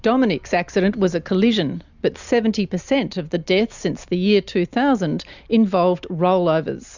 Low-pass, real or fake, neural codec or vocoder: 7.2 kHz; real; none